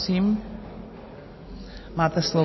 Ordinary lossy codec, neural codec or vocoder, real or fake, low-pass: MP3, 24 kbps; none; real; 7.2 kHz